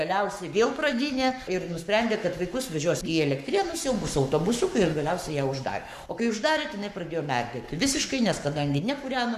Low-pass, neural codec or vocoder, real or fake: 14.4 kHz; codec, 44.1 kHz, 7.8 kbps, Pupu-Codec; fake